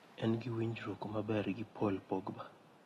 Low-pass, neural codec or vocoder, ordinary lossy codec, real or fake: 19.8 kHz; none; AAC, 32 kbps; real